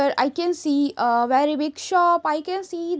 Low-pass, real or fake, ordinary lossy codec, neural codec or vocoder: none; real; none; none